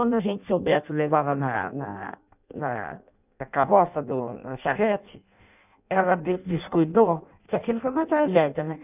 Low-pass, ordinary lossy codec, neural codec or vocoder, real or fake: 3.6 kHz; none; codec, 16 kHz in and 24 kHz out, 0.6 kbps, FireRedTTS-2 codec; fake